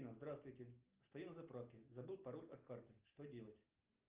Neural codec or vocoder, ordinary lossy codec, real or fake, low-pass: none; Opus, 24 kbps; real; 3.6 kHz